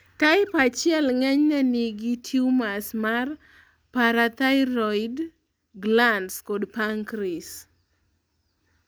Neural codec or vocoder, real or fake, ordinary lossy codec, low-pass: none; real; none; none